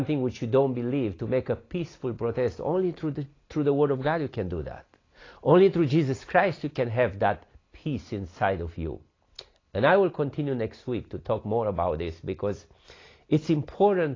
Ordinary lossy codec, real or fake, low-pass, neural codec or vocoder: AAC, 32 kbps; real; 7.2 kHz; none